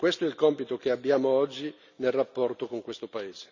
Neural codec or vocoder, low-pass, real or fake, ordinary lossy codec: none; 7.2 kHz; real; none